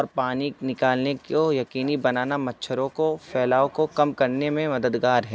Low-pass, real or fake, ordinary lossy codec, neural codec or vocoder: none; real; none; none